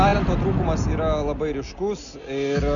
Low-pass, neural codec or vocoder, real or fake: 7.2 kHz; none; real